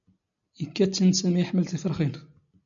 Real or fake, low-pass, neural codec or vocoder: real; 7.2 kHz; none